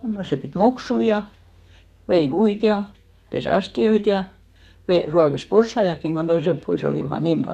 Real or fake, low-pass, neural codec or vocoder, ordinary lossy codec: fake; 14.4 kHz; codec, 32 kHz, 1.9 kbps, SNAC; none